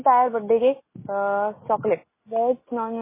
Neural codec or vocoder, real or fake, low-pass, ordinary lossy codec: none; real; 3.6 kHz; MP3, 16 kbps